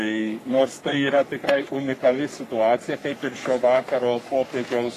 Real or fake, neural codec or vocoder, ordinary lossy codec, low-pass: fake; codec, 44.1 kHz, 3.4 kbps, Pupu-Codec; AAC, 48 kbps; 14.4 kHz